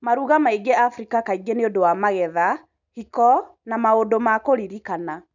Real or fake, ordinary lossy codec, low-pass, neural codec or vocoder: real; none; 7.2 kHz; none